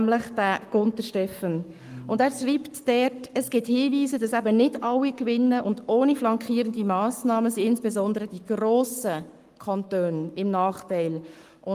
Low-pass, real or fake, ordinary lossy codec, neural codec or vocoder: 14.4 kHz; fake; Opus, 32 kbps; codec, 44.1 kHz, 7.8 kbps, Pupu-Codec